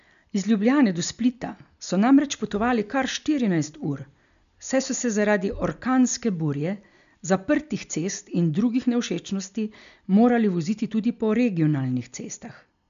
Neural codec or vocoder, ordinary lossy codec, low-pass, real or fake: none; none; 7.2 kHz; real